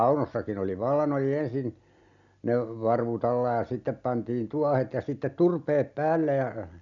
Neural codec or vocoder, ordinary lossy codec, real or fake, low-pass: none; none; real; 7.2 kHz